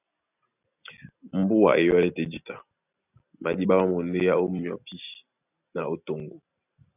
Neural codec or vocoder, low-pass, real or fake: none; 3.6 kHz; real